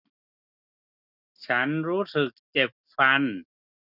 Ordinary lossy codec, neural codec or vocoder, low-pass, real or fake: none; none; 5.4 kHz; real